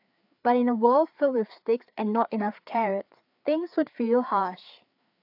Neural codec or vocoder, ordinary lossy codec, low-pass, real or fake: codec, 16 kHz, 4 kbps, FreqCodec, larger model; AAC, 48 kbps; 5.4 kHz; fake